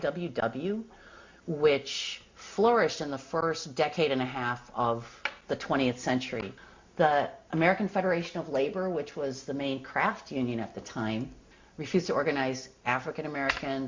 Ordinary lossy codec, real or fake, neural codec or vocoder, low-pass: MP3, 48 kbps; real; none; 7.2 kHz